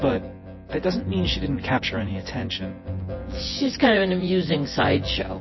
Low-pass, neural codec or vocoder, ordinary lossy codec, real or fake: 7.2 kHz; vocoder, 24 kHz, 100 mel bands, Vocos; MP3, 24 kbps; fake